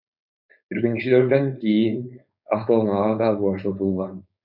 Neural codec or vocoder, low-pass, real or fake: codec, 16 kHz, 4.8 kbps, FACodec; 5.4 kHz; fake